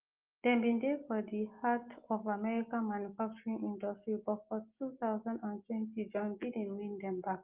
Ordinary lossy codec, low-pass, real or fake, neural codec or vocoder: Opus, 32 kbps; 3.6 kHz; real; none